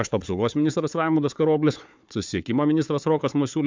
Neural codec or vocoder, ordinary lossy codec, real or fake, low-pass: codec, 16 kHz, 4 kbps, FunCodec, trained on Chinese and English, 50 frames a second; MP3, 64 kbps; fake; 7.2 kHz